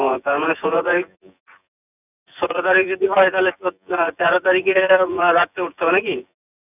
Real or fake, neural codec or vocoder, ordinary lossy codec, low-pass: fake; vocoder, 24 kHz, 100 mel bands, Vocos; none; 3.6 kHz